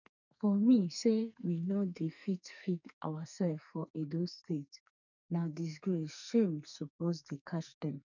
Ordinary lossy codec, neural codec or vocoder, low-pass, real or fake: none; codec, 44.1 kHz, 2.6 kbps, SNAC; 7.2 kHz; fake